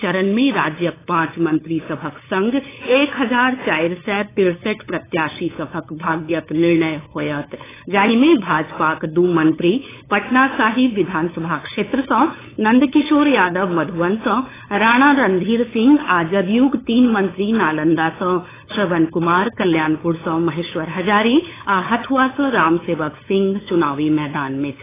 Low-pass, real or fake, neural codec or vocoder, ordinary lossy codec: 3.6 kHz; fake; codec, 16 kHz, 8 kbps, FunCodec, trained on LibriTTS, 25 frames a second; AAC, 16 kbps